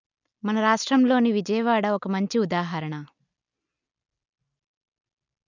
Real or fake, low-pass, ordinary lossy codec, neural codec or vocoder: real; 7.2 kHz; none; none